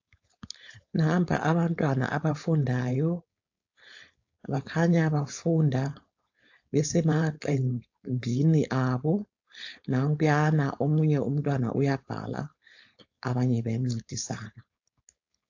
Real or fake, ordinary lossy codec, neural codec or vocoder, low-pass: fake; AAC, 48 kbps; codec, 16 kHz, 4.8 kbps, FACodec; 7.2 kHz